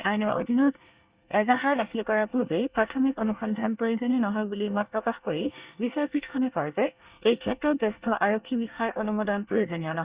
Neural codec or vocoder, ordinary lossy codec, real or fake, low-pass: codec, 24 kHz, 1 kbps, SNAC; Opus, 64 kbps; fake; 3.6 kHz